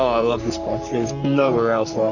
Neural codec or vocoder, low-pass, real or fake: codec, 44.1 kHz, 3.4 kbps, Pupu-Codec; 7.2 kHz; fake